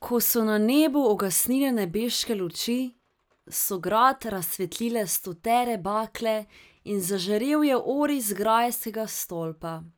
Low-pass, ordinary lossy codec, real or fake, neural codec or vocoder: none; none; real; none